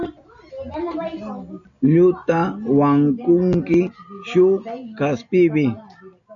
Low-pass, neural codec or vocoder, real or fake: 7.2 kHz; none; real